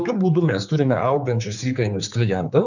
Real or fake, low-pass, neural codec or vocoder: fake; 7.2 kHz; codec, 16 kHz, 4 kbps, X-Codec, HuBERT features, trained on general audio